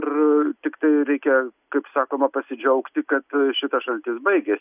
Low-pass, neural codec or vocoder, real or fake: 3.6 kHz; none; real